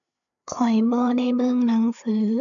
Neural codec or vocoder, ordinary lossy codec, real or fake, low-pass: codec, 16 kHz, 4 kbps, FreqCodec, larger model; none; fake; 7.2 kHz